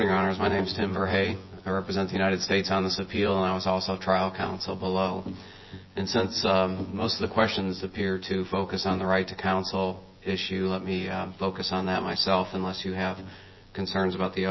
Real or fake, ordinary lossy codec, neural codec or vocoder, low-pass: fake; MP3, 24 kbps; vocoder, 24 kHz, 100 mel bands, Vocos; 7.2 kHz